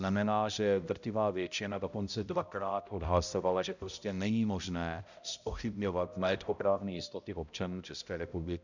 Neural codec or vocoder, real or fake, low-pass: codec, 16 kHz, 0.5 kbps, X-Codec, HuBERT features, trained on balanced general audio; fake; 7.2 kHz